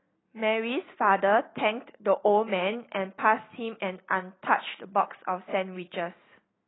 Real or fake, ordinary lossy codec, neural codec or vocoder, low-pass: real; AAC, 16 kbps; none; 7.2 kHz